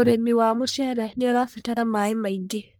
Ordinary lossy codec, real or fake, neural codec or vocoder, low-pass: none; fake; codec, 44.1 kHz, 1.7 kbps, Pupu-Codec; none